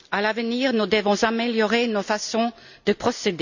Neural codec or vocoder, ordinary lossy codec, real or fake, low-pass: none; none; real; 7.2 kHz